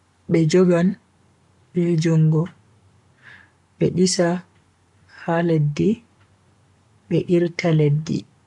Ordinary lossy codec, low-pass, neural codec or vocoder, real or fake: none; 10.8 kHz; codec, 44.1 kHz, 7.8 kbps, Pupu-Codec; fake